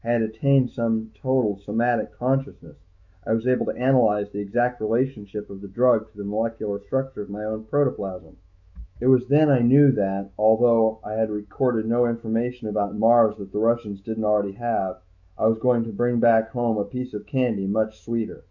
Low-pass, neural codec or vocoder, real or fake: 7.2 kHz; autoencoder, 48 kHz, 128 numbers a frame, DAC-VAE, trained on Japanese speech; fake